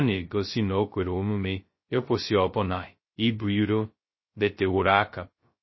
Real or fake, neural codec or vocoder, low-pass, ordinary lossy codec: fake; codec, 16 kHz, 0.2 kbps, FocalCodec; 7.2 kHz; MP3, 24 kbps